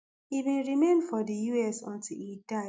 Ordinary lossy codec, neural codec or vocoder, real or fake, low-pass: none; none; real; none